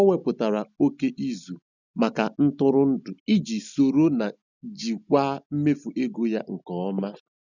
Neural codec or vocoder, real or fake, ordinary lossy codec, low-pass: none; real; none; 7.2 kHz